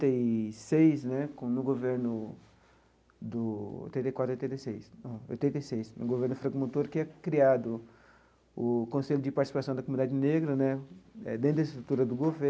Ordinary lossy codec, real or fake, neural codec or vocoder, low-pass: none; real; none; none